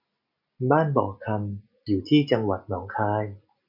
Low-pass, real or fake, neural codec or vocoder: 5.4 kHz; real; none